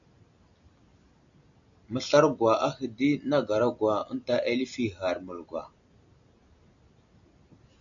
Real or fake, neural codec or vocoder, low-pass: real; none; 7.2 kHz